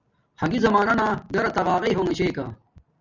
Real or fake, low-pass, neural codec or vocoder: real; 7.2 kHz; none